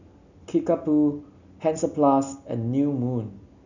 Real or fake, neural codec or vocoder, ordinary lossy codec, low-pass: real; none; none; 7.2 kHz